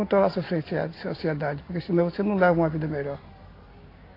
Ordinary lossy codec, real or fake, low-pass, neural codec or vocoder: AAC, 24 kbps; real; 5.4 kHz; none